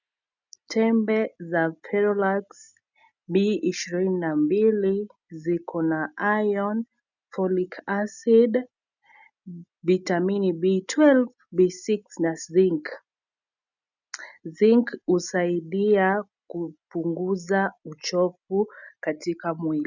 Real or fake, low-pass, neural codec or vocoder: real; 7.2 kHz; none